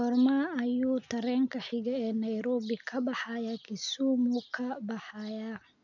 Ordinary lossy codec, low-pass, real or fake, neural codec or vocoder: none; 7.2 kHz; real; none